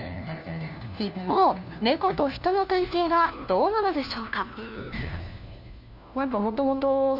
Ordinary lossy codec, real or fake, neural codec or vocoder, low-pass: none; fake; codec, 16 kHz, 1 kbps, FunCodec, trained on LibriTTS, 50 frames a second; 5.4 kHz